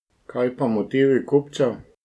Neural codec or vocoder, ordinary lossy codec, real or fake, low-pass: none; none; real; none